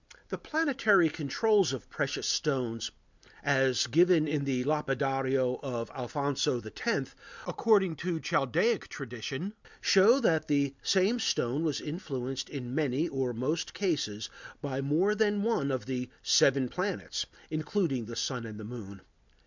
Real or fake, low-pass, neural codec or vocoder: real; 7.2 kHz; none